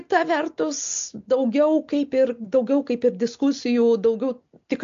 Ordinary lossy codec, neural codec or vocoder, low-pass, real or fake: AAC, 64 kbps; none; 7.2 kHz; real